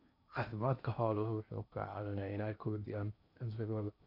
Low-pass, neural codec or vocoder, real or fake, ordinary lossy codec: 5.4 kHz; codec, 16 kHz in and 24 kHz out, 0.8 kbps, FocalCodec, streaming, 65536 codes; fake; none